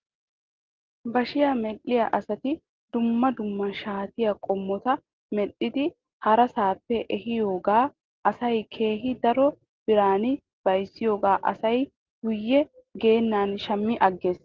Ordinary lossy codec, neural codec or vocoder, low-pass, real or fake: Opus, 16 kbps; none; 7.2 kHz; real